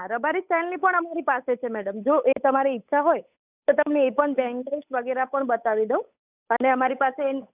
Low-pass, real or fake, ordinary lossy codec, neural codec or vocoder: 3.6 kHz; fake; none; codec, 16 kHz, 8 kbps, FunCodec, trained on Chinese and English, 25 frames a second